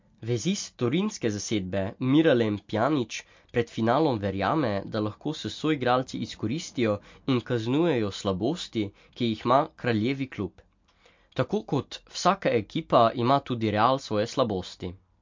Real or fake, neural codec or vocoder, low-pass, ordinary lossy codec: real; none; 7.2 kHz; MP3, 48 kbps